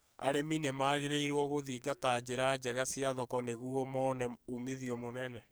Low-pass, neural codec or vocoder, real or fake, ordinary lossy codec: none; codec, 44.1 kHz, 2.6 kbps, SNAC; fake; none